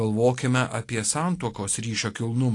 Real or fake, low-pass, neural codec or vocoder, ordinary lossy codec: real; 10.8 kHz; none; AAC, 48 kbps